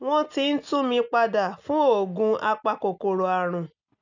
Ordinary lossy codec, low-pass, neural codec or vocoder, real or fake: none; 7.2 kHz; none; real